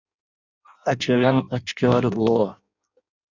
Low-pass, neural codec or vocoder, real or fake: 7.2 kHz; codec, 16 kHz in and 24 kHz out, 0.6 kbps, FireRedTTS-2 codec; fake